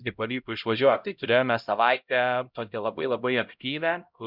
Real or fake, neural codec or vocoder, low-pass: fake; codec, 16 kHz, 0.5 kbps, X-Codec, HuBERT features, trained on LibriSpeech; 5.4 kHz